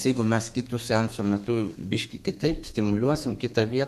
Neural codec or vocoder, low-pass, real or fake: codec, 32 kHz, 1.9 kbps, SNAC; 14.4 kHz; fake